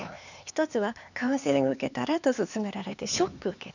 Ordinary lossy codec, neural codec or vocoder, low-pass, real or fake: none; codec, 16 kHz, 4 kbps, X-Codec, HuBERT features, trained on LibriSpeech; 7.2 kHz; fake